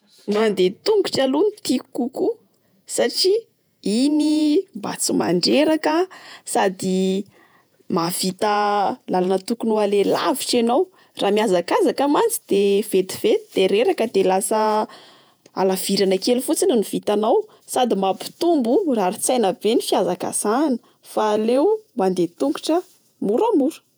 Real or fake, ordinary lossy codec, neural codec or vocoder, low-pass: fake; none; vocoder, 48 kHz, 128 mel bands, Vocos; none